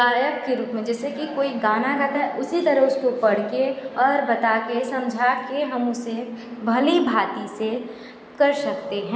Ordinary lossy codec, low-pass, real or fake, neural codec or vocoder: none; none; real; none